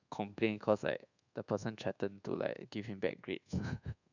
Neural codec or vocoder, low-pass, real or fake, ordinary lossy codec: codec, 24 kHz, 1.2 kbps, DualCodec; 7.2 kHz; fake; none